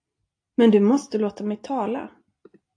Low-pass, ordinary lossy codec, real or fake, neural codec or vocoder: 9.9 kHz; AAC, 48 kbps; real; none